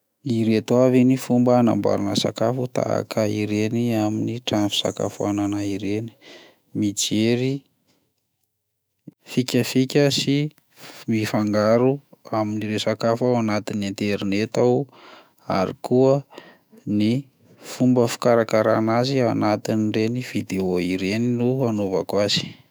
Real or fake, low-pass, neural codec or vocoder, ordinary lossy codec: fake; none; autoencoder, 48 kHz, 128 numbers a frame, DAC-VAE, trained on Japanese speech; none